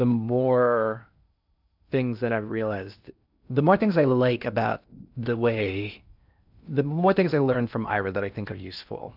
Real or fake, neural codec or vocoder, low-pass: fake; codec, 16 kHz in and 24 kHz out, 0.8 kbps, FocalCodec, streaming, 65536 codes; 5.4 kHz